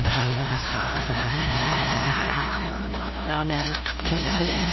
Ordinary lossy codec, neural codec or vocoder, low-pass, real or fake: MP3, 24 kbps; codec, 16 kHz, 0.5 kbps, FunCodec, trained on LibriTTS, 25 frames a second; 7.2 kHz; fake